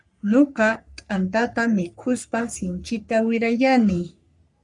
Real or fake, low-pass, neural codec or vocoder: fake; 10.8 kHz; codec, 44.1 kHz, 3.4 kbps, Pupu-Codec